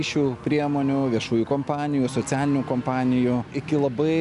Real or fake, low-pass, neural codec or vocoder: real; 10.8 kHz; none